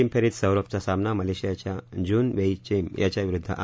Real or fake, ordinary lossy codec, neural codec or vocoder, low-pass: real; none; none; none